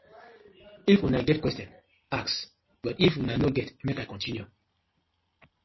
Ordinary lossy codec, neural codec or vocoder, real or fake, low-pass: MP3, 24 kbps; none; real; 7.2 kHz